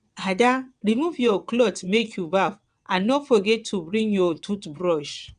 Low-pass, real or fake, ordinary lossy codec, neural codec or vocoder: 9.9 kHz; fake; none; vocoder, 22.05 kHz, 80 mel bands, WaveNeXt